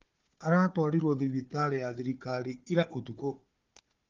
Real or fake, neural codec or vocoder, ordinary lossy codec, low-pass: fake; codec, 16 kHz, 4 kbps, FunCodec, trained on Chinese and English, 50 frames a second; Opus, 32 kbps; 7.2 kHz